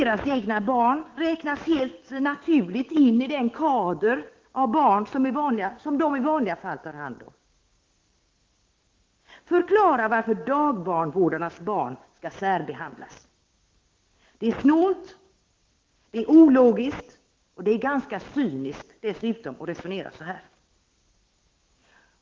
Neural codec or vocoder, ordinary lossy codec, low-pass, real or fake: codec, 16 kHz, 6 kbps, DAC; Opus, 16 kbps; 7.2 kHz; fake